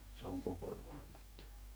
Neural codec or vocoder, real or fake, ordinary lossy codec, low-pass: codec, 44.1 kHz, 2.6 kbps, DAC; fake; none; none